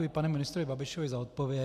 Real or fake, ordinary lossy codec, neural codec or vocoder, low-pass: real; MP3, 96 kbps; none; 14.4 kHz